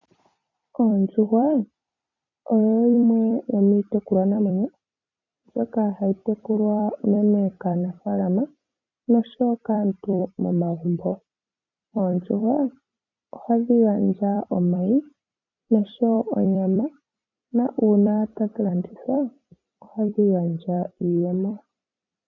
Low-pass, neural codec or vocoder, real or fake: 7.2 kHz; vocoder, 44.1 kHz, 128 mel bands every 256 samples, BigVGAN v2; fake